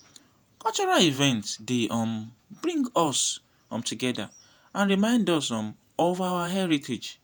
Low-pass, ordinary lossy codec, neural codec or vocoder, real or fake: none; none; vocoder, 48 kHz, 128 mel bands, Vocos; fake